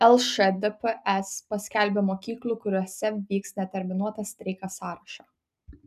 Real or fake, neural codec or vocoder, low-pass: real; none; 14.4 kHz